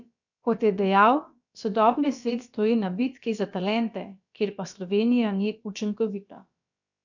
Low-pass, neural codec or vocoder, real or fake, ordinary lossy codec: 7.2 kHz; codec, 16 kHz, about 1 kbps, DyCAST, with the encoder's durations; fake; none